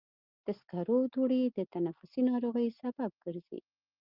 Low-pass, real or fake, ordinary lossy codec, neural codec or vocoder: 5.4 kHz; real; Opus, 32 kbps; none